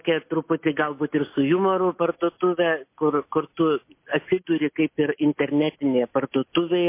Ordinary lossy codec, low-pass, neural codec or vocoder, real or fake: MP3, 24 kbps; 3.6 kHz; none; real